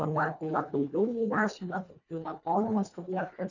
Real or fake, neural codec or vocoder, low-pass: fake; codec, 24 kHz, 1.5 kbps, HILCodec; 7.2 kHz